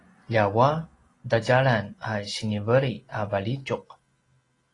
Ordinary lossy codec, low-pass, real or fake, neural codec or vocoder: AAC, 32 kbps; 10.8 kHz; real; none